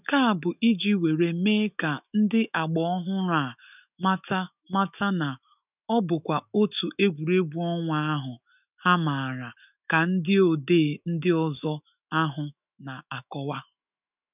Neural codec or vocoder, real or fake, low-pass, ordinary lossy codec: autoencoder, 48 kHz, 128 numbers a frame, DAC-VAE, trained on Japanese speech; fake; 3.6 kHz; none